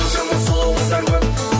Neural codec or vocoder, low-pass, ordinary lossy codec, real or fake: none; none; none; real